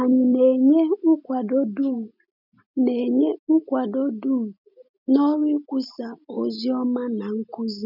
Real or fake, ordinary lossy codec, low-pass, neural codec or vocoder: real; none; 5.4 kHz; none